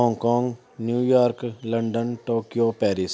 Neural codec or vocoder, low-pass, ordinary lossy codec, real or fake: none; none; none; real